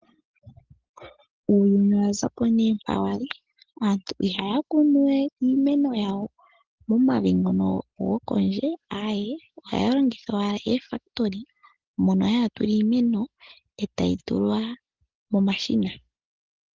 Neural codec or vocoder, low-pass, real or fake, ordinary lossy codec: none; 7.2 kHz; real; Opus, 16 kbps